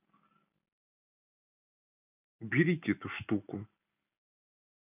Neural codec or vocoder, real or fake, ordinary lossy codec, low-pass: none; real; none; 3.6 kHz